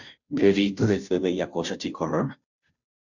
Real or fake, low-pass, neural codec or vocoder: fake; 7.2 kHz; codec, 16 kHz, 0.5 kbps, FunCodec, trained on Chinese and English, 25 frames a second